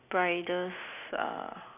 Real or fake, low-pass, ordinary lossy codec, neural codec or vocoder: real; 3.6 kHz; none; none